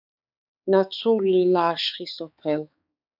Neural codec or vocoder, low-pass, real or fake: codec, 16 kHz, 4 kbps, X-Codec, HuBERT features, trained on balanced general audio; 5.4 kHz; fake